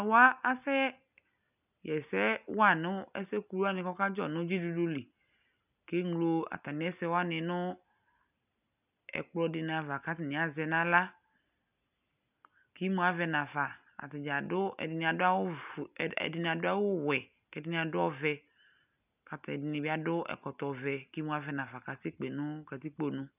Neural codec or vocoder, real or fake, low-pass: none; real; 3.6 kHz